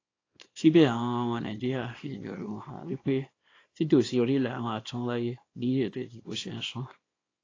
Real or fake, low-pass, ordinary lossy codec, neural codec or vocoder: fake; 7.2 kHz; AAC, 32 kbps; codec, 24 kHz, 0.9 kbps, WavTokenizer, small release